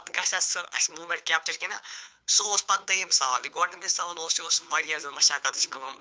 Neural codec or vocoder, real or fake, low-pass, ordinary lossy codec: codec, 16 kHz, 2 kbps, FunCodec, trained on Chinese and English, 25 frames a second; fake; none; none